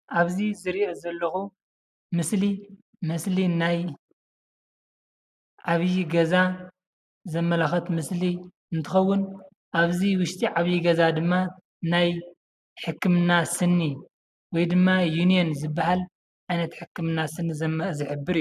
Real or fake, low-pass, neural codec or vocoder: real; 14.4 kHz; none